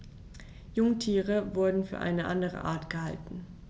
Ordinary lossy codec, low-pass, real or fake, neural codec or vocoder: none; none; real; none